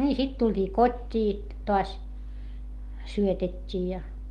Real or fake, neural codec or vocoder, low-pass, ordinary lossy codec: real; none; 19.8 kHz; Opus, 24 kbps